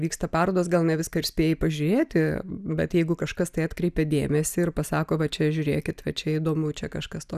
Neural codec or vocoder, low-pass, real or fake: none; 14.4 kHz; real